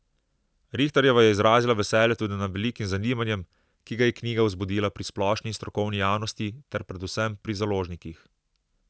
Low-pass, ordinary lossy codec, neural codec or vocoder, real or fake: none; none; none; real